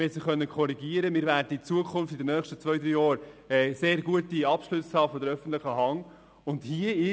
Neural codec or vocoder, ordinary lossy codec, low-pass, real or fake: none; none; none; real